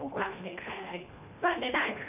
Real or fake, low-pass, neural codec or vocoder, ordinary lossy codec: fake; 3.6 kHz; codec, 24 kHz, 1.5 kbps, HILCodec; AAC, 32 kbps